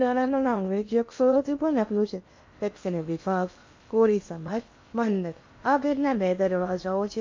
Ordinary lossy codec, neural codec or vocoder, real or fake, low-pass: MP3, 48 kbps; codec, 16 kHz in and 24 kHz out, 0.8 kbps, FocalCodec, streaming, 65536 codes; fake; 7.2 kHz